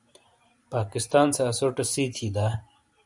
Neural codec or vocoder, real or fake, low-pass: vocoder, 44.1 kHz, 128 mel bands every 512 samples, BigVGAN v2; fake; 10.8 kHz